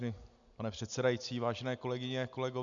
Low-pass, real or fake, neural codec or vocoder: 7.2 kHz; real; none